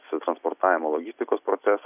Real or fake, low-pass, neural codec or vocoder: real; 3.6 kHz; none